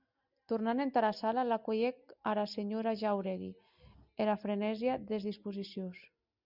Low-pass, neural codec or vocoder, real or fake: 5.4 kHz; none; real